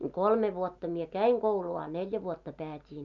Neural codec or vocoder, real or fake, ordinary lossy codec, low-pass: none; real; none; 7.2 kHz